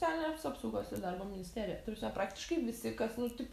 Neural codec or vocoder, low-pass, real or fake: none; 14.4 kHz; real